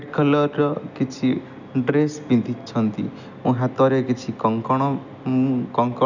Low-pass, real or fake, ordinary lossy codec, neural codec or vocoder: 7.2 kHz; real; none; none